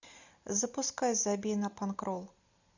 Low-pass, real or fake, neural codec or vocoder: 7.2 kHz; real; none